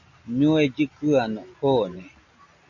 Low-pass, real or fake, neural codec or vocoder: 7.2 kHz; real; none